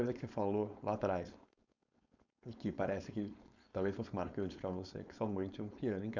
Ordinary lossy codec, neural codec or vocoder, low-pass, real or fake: none; codec, 16 kHz, 4.8 kbps, FACodec; 7.2 kHz; fake